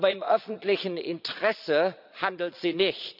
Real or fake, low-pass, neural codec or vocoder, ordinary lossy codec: fake; 5.4 kHz; vocoder, 22.05 kHz, 80 mel bands, Vocos; none